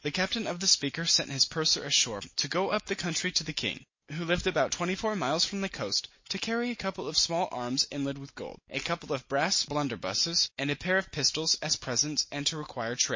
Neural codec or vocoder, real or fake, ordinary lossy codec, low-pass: none; real; MP3, 32 kbps; 7.2 kHz